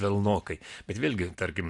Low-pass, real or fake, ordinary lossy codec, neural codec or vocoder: 10.8 kHz; real; AAC, 64 kbps; none